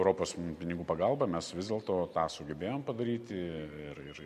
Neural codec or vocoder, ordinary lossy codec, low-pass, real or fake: none; MP3, 96 kbps; 14.4 kHz; real